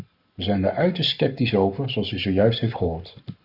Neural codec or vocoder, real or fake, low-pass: codec, 44.1 kHz, 7.8 kbps, Pupu-Codec; fake; 5.4 kHz